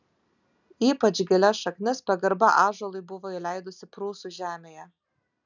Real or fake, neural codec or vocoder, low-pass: real; none; 7.2 kHz